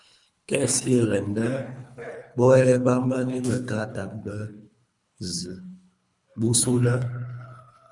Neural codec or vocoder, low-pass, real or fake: codec, 24 kHz, 3 kbps, HILCodec; 10.8 kHz; fake